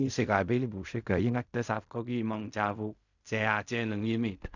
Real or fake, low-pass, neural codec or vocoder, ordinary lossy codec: fake; 7.2 kHz; codec, 16 kHz in and 24 kHz out, 0.4 kbps, LongCat-Audio-Codec, fine tuned four codebook decoder; none